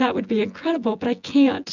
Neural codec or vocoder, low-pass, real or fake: vocoder, 24 kHz, 100 mel bands, Vocos; 7.2 kHz; fake